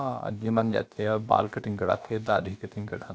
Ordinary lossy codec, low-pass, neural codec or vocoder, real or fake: none; none; codec, 16 kHz, about 1 kbps, DyCAST, with the encoder's durations; fake